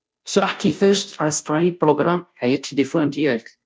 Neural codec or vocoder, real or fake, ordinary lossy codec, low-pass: codec, 16 kHz, 0.5 kbps, FunCodec, trained on Chinese and English, 25 frames a second; fake; none; none